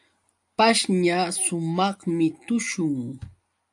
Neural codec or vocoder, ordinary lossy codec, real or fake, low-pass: none; AAC, 64 kbps; real; 10.8 kHz